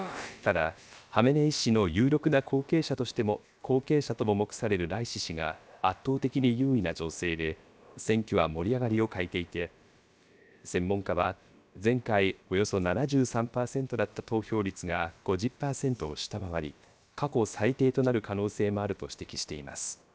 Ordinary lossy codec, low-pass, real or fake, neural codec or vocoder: none; none; fake; codec, 16 kHz, about 1 kbps, DyCAST, with the encoder's durations